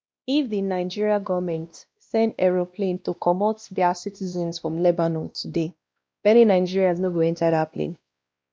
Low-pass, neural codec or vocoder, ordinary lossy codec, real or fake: none; codec, 16 kHz, 1 kbps, X-Codec, WavLM features, trained on Multilingual LibriSpeech; none; fake